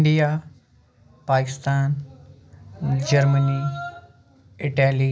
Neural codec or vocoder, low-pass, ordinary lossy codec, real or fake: none; none; none; real